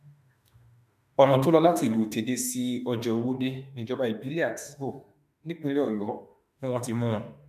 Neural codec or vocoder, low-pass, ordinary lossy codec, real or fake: autoencoder, 48 kHz, 32 numbers a frame, DAC-VAE, trained on Japanese speech; 14.4 kHz; none; fake